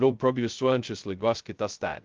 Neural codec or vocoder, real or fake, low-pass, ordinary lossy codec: codec, 16 kHz, 0.2 kbps, FocalCodec; fake; 7.2 kHz; Opus, 32 kbps